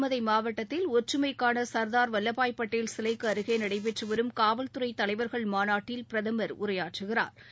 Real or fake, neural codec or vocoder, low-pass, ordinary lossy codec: real; none; none; none